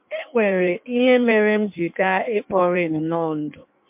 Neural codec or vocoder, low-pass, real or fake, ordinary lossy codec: codec, 16 kHz in and 24 kHz out, 1.1 kbps, FireRedTTS-2 codec; 3.6 kHz; fake; MP3, 32 kbps